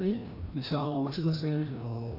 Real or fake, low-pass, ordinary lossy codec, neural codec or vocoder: fake; 5.4 kHz; none; codec, 16 kHz, 1 kbps, FreqCodec, larger model